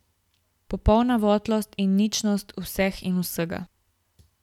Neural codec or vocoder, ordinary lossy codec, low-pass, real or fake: none; none; 19.8 kHz; real